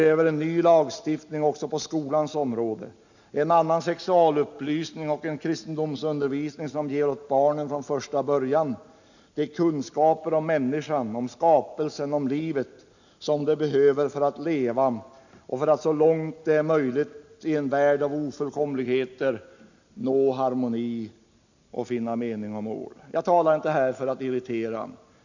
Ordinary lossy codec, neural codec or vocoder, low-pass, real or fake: none; none; 7.2 kHz; real